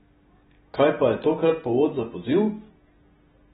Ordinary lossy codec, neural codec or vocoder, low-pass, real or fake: AAC, 16 kbps; none; 19.8 kHz; real